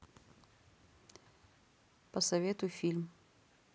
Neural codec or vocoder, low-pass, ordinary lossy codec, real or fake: none; none; none; real